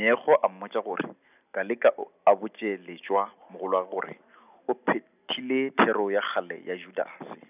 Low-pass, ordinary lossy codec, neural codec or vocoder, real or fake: 3.6 kHz; none; none; real